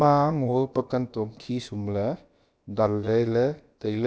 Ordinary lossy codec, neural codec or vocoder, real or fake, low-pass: none; codec, 16 kHz, about 1 kbps, DyCAST, with the encoder's durations; fake; none